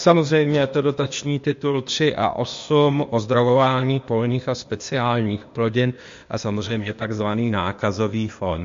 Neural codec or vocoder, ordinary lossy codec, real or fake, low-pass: codec, 16 kHz, 0.8 kbps, ZipCodec; MP3, 48 kbps; fake; 7.2 kHz